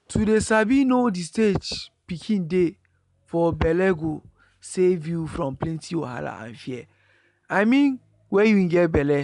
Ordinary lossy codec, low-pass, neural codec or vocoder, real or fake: none; 10.8 kHz; none; real